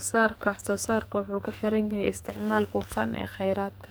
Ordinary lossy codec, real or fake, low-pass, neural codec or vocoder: none; fake; none; codec, 44.1 kHz, 2.6 kbps, SNAC